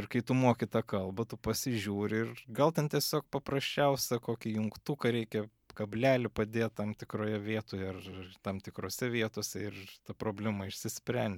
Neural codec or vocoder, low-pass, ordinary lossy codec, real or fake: none; 19.8 kHz; MP3, 96 kbps; real